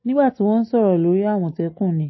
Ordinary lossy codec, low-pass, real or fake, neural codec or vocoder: MP3, 24 kbps; 7.2 kHz; real; none